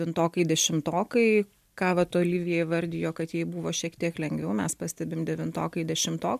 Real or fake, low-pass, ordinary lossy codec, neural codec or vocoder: real; 14.4 kHz; MP3, 96 kbps; none